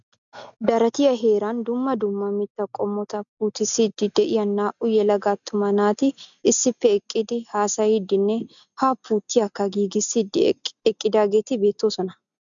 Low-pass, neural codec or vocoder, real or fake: 7.2 kHz; none; real